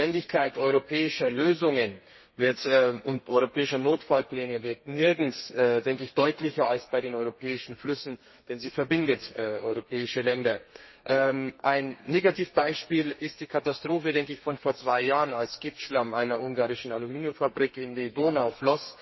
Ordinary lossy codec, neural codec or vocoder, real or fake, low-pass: MP3, 24 kbps; codec, 32 kHz, 1.9 kbps, SNAC; fake; 7.2 kHz